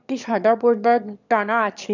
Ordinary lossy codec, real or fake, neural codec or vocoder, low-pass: none; fake; autoencoder, 22.05 kHz, a latent of 192 numbers a frame, VITS, trained on one speaker; 7.2 kHz